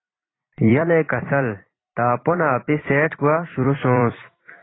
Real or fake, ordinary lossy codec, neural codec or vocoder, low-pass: fake; AAC, 16 kbps; vocoder, 44.1 kHz, 128 mel bands every 256 samples, BigVGAN v2; 7.2 kHz